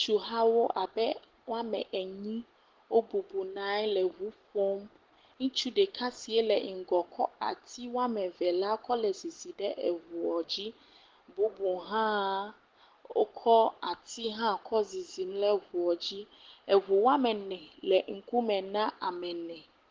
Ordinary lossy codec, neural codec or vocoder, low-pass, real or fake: Opus, 16 kbps; none; 7.2 kHz; real